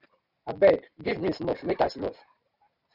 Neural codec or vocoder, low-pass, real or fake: none; 5.4 kHz; real